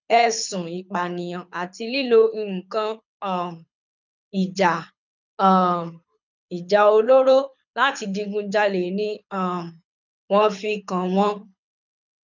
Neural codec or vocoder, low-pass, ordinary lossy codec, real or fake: codec, 24 kHz, 6 kbps, HILCodec; 7.2 kHz; none; fake